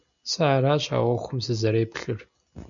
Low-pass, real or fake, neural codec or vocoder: 7.2 kHz; real; none